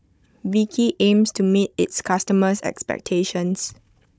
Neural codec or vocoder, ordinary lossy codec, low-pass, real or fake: codec, 16 kHz, 16 kbps, FunCodec, trained on Chinese and English, 50 frames a second; none; none; fake